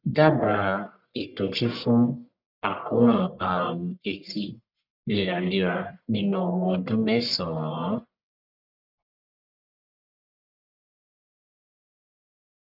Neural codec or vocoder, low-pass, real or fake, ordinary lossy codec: codec, 44.1 kHz, 1.7 kbps, Pupu-Codec; 5.4 kHz; fake; none